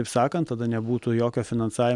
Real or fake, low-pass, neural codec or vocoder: real; 10.8 kHz; none